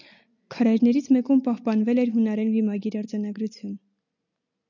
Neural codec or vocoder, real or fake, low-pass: none; real; 7.2 kHz